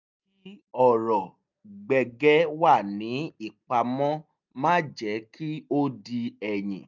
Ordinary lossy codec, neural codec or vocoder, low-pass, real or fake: none; none; 7.2 kHz; real